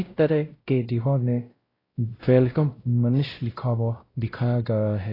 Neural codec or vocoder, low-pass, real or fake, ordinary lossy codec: codec, 16 kHz, 0.5 kbps, X-Codec, WavLM features, trained on Multilingual LibriSpeech; 5.4 kHz; fake; AAC, 24 kbps